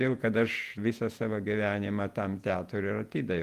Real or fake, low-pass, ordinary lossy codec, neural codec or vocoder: fake; 14.4 kHz; Opus, 32 kbps; vocoder, 48 kHz, 128 mel bands, Vocos